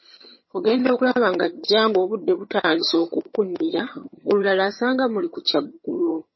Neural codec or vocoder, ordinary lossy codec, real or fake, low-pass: vocoder, 22.05 kHz, 80 mel bands, HiFi-GAN; MP3, 24 kbps; fake; 7.2 kHz